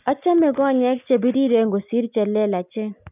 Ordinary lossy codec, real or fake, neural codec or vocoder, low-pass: none; real; none; 3.6 kHz